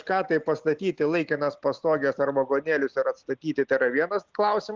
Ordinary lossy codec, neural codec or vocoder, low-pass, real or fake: Opus, 24 kbps; none; 7.2 kHz; real